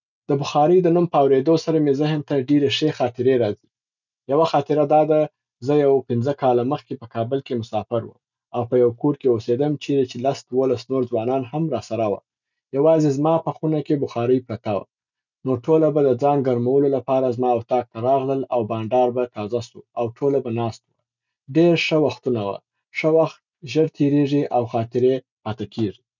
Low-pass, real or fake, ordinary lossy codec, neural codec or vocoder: 7.2 kHz; real; none; none